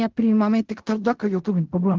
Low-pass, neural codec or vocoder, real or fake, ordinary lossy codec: 7.2 kHz; codec, 16 kHz in and 24 kHz out, 0.4 kbps, LongCat-Audio-Codec, fine tuned four codebook decoder; fake; Opus, 16 kbps